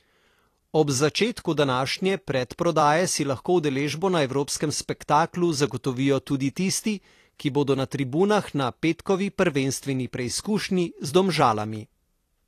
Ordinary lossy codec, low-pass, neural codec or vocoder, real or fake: AAC, 48 kbps; 14.4 kHz; vocoder, 44.1 kHz, 128 mel bands every 256 samples, BigVGAN v2; fake